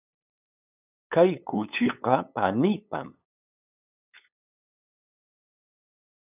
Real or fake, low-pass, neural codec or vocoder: fake; 3.6 kHz; codec, 16 kHz, 8 kbps, FunCodec, trained on LibriTTS, 25 frames a second